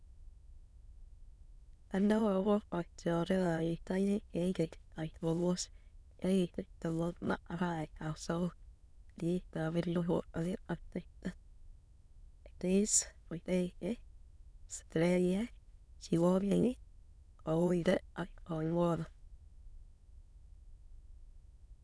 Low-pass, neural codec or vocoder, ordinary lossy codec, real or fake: none; autoencoder, 22.05 kHz, a latent of 192 numbers a frame, VITS, trained on many speakers; none; fake